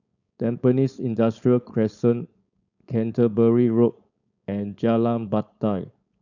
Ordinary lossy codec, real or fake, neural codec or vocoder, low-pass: none; fake; codec, 16 kHz, 4.8 kbps, FACodec; 7.2 kHz